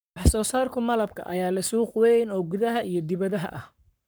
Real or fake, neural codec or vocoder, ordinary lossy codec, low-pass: fake; codec, 44.1 kHz, 7.8 kbps, Pupu-Codec; none; none